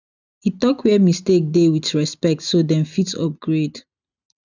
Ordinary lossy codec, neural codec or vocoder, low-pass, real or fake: none; none; 7.2 kHz; real